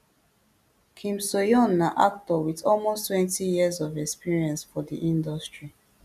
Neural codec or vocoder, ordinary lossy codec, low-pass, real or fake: none; none; 14.4 kHz; real